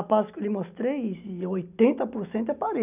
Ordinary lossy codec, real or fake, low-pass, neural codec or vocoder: none; real; 3.6 kHz; none